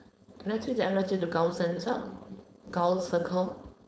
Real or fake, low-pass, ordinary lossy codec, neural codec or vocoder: fake; none; none; codec, 16 kHz, 4.8 kbps, FACodec